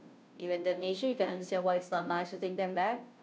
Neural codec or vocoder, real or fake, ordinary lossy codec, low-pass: codec, 16 kHz, 0.5 kbps, FunCodec, trained on Chinese and English, 25 frames a second; fake; none; none